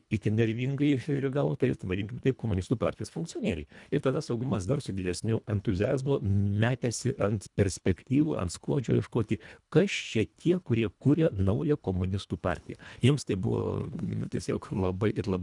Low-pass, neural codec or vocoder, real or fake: 10.8 kHz; codec, 24 kHz, 1.5 kbps, HILCodec; fake